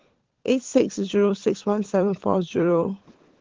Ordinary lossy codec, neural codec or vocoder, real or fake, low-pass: Opus, 16 kbps; codec, 16 kHz, 16 kbps, FunCodec, trained on LibriTTS, 50 frames a second; fake; 7.2 kHz